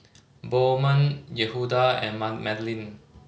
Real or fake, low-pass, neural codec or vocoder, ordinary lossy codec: real; none; none; none